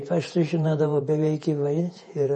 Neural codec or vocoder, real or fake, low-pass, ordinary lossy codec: vocoder, 48 kHz, 128 mel bands, Vocos; fake; 10.8 kHz; MP3, 32 kbps